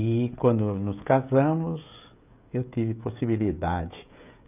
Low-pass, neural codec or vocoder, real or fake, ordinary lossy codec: 3.6 kHz; codec, 16 kHz, 16 kbps, FreqCodec, smaller model; fake; none